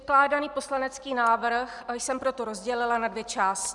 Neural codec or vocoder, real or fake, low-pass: none; real; 10.8 kHz